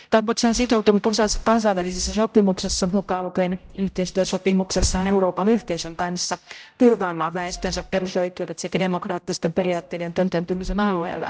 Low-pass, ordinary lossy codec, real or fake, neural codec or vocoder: none; none; fake; codec, 16 kHz, 0.5 kbps, X-Codec, HuBERT features, trained on general audio